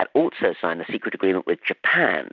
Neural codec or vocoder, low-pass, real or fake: none; 7.2 kHz; real